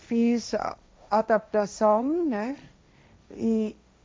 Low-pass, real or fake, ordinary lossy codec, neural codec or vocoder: none; fake; none; codec, 16 kHz, 1.1 kbps, Voila-Tokenizer